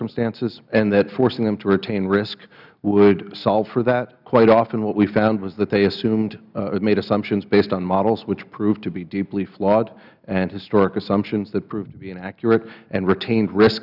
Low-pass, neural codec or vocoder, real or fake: 5.4 kHz; none; real